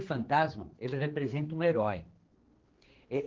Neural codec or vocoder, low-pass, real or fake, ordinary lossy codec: codec, 16 kHz, 2 kbps, X-Codec, HuBERT features, trained on general audio; 7.2 kHz; fake; Opus, 16 kbps